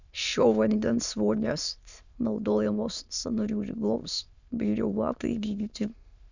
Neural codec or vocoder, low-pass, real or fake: autoencoder, 22.05 kHz, a latent of 192 numbers a frame, VITS, trained on many speakers; 7.2 kHz; fake